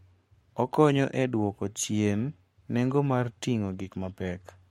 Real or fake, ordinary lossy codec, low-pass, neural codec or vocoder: fake; MP3, 64 kbps; 19.8 kHz; codec, 44.1 kHz, 7.8 kbps, Pupu-Codec